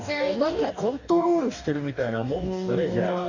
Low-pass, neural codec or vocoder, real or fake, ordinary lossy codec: 7.2 kHz; codec, 44.1 kHz, 2.6 kbps, DAC; fake; none